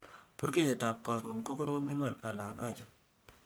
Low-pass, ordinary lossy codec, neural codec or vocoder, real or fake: none; none; codec, 44.1 kHz, 1.7 kbps, Pupu-Codec; fake